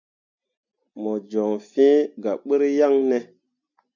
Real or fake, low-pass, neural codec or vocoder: real; 7.2 kHz; none